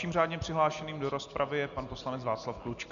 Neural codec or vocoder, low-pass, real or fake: none; 7.2 kHz; real